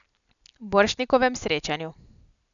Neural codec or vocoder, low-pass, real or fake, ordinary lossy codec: none; 7.2 kHz; real; none